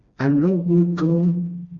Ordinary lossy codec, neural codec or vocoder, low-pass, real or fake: Opus, 64 kbps; codec, 16 kHz, 1 kbps, FreqCodec, smaller model; 7.2 kHz; fake